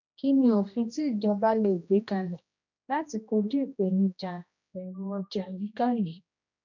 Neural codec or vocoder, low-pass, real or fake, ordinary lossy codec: codec, 16 kHz, 1 kbps, X-Codec, HuBERT features, trained on general audio; 7.2 kHz; fake; none